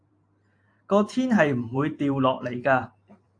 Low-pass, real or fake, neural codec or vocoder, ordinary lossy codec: 9.9 kHz; fake; vocoder, 44.1 kHz, 128 mel bands every 512 samples, BigVGAN v2; AAC, 48 kbps